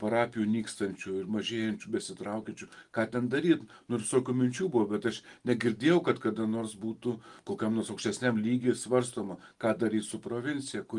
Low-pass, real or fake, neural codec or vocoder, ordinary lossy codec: 10.8 kHz; real; none; Opus, 24 kbps